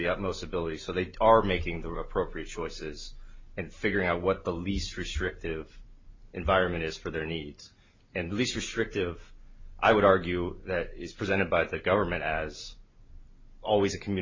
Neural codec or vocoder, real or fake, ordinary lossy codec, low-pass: none; real; MP3, 48 kbps; 7.2 kHz